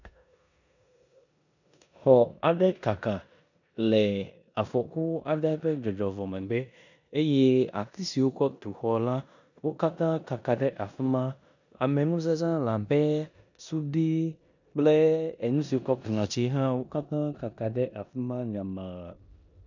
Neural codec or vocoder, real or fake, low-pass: codec, 16 kHz in and 24 kHz out, 0.9 kbps, LongCat-Audio-Codec, four codebook decoder; fake; 7.2 kHz